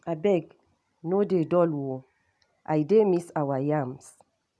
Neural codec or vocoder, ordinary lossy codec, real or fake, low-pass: none; none; real; none